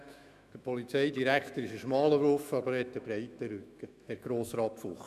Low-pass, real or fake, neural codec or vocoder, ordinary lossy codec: 14.4 kHz; fake; autoencoder, 48 kHz, 128 numbers a frame, DAC-VAE, trained on Japanese speech; none